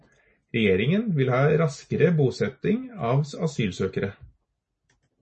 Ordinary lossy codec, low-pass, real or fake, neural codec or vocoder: MP3, 32 kbps; 10.8 kHz; real; none